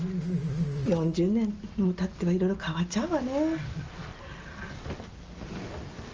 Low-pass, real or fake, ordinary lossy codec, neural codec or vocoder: 7.2 kHz; real; Opus, 24 kbps; none